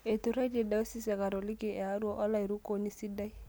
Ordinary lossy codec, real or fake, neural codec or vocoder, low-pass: none; real; none; none